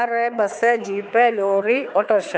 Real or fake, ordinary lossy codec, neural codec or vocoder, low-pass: fake; none; codec, 16 kHz, 4 kbps, X-Codec, HuBERT features, trained on balanced general audio; none